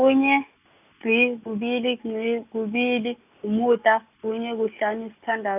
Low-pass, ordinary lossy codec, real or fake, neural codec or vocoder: 3.6 kHz; none; real; none